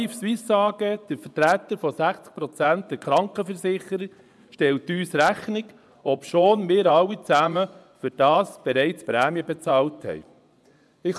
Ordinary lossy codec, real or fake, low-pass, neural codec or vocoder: none; fake; none; vocoder, 24 kHz, 100 mel bands, Vocos